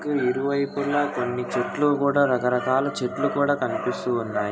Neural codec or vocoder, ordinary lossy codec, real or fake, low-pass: none; none; real; none